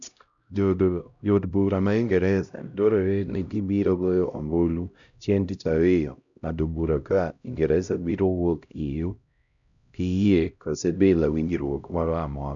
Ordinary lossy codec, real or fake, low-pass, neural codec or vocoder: none; fake; 7.2 kHz; codec, 16 kHz, 0.5 kbps, X-Codec, HuBERT features, trained on LibriSpeech